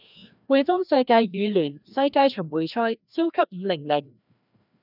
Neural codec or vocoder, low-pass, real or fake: codec, 16 kHz, 1 kbps, FreqCodec, larger model; 5.4 kHz; fake